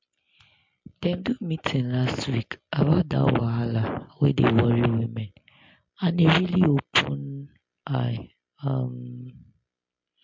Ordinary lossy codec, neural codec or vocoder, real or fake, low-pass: MP3, 48 kbps; none; real; 7.2 kHz